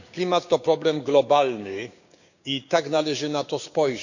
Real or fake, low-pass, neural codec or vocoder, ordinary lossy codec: fake; 7.2 kHz; codec, 16 kHz, 6 kbps, DAC; none